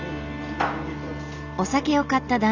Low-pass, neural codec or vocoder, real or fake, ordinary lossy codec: 7.2 kHz; none; real; none